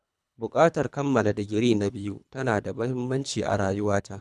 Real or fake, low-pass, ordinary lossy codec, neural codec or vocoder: fake; none; none; codec, 24 kHz, 3 kbps, HILCodec